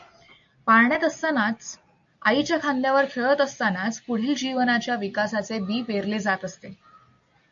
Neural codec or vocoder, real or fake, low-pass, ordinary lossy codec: none; real; 7.2 kHz; AAC, 64 kbps